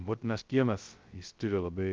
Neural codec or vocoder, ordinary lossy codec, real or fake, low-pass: codec, 16 kHz, 0.2 kbps, FocalCodec; Opus, 32 kbps; fake; 7.2 kHz